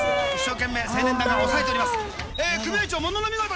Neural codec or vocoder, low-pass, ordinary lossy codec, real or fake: none; none; none; real